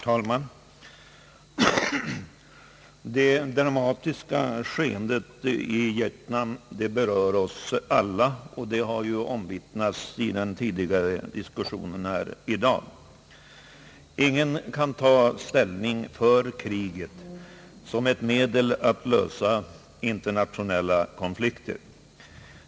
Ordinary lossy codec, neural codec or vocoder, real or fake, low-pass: none; none; real; none